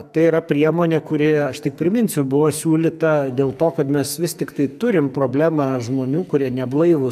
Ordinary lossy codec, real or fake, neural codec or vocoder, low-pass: AAC, 96 kbps; fake; codec, 44.1 kHz, 2.6 kbps, SNAC; 14.4 kHz